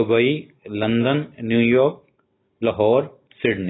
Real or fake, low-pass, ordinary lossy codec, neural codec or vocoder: real; 7.2 kHz; AAC, 16 kbps; none